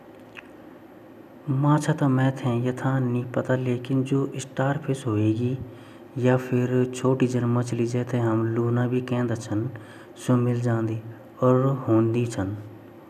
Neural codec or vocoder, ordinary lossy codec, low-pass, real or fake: none; none; 14.4 kHz; real